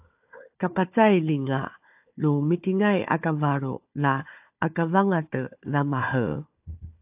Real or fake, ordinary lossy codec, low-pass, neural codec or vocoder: fake; AAC, 32 kbps; 3.6 kHz; codec, 16 kHz, 4 kbps, FunCodec, trained on Chinese and English, 50 frames a second